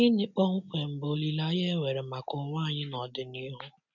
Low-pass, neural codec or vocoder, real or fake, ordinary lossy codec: 7.2 kHz; none; real; none